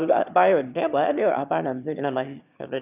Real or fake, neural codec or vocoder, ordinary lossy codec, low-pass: fake; autoencoder, 22.05 kHz, a latent of 192 numbers a frame, VITS, trained on one speaker; none; 3.6 kHz